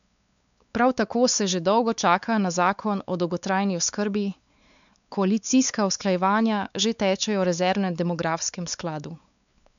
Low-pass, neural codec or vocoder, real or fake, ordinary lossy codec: 7.2 kHz; codec, 16 kHz, 4 kbps, X-Codec, WavLM features, trained on Multilingual LibriSpeech; fake; none